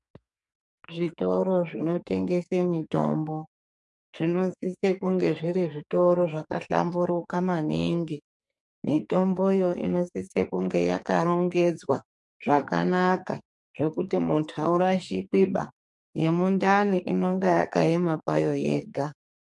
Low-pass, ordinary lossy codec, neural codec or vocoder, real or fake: 10.8 kHz; MP3, 64 kbps; codec, 44.1 kHz, 2.6 kbps, SNAC; fake